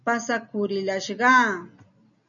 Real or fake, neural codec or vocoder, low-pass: real; none; 7.2 kHz